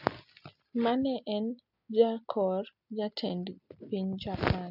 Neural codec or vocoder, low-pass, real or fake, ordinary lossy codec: none; 5.4 kHz; real; none